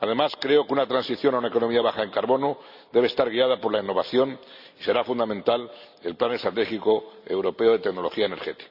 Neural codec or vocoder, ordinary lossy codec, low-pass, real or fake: none; none; 5.4 kHz; real